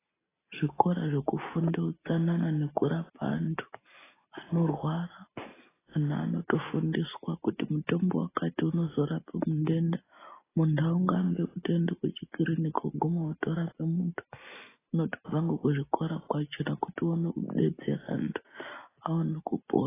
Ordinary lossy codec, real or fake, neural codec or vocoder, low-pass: AAC, 16 kbps; real; none; 3.6 kHz